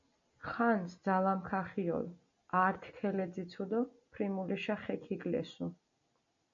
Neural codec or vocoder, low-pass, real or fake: none; 7.2 kHz; real